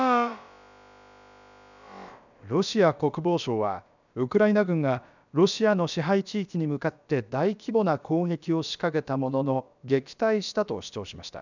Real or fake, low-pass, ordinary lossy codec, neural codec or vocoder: fake; 7.2 kHz; none; codec, 16 kHz, about 1 kbps, DyCAST, with the encoder's durations